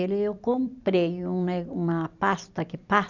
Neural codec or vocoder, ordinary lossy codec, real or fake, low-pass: vocoder, 44.1 kHz, 128 mel bands every 256 samples, BigVGAN v2; none; fake; 7.2 kHz